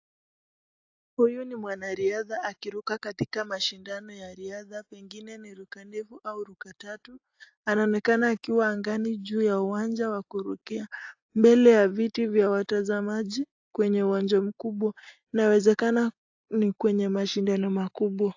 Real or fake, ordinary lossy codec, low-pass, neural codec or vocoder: real; AAC, 48 kbps; 7.2 kHz; none